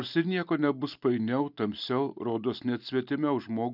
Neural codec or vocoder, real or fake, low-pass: none; real; 5.4 kHz